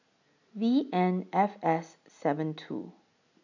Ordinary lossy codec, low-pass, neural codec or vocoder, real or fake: none; 7.2 kHz; none; real